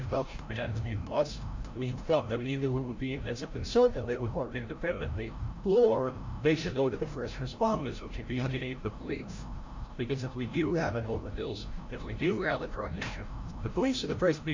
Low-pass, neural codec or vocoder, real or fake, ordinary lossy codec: 7.2 kHz; codec, 16 kHz, 0.5 kbps, FreqCodec, larger model; fake; MP3, 48 kbps